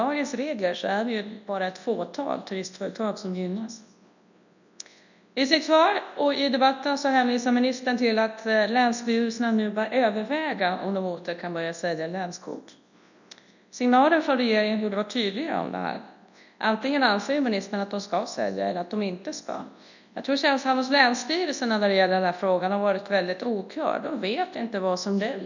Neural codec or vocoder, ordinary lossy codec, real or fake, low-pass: codec, 24 kHz, 0.9 kbps, WavTokenizer, large speech release; none; fake; 7.2 kHz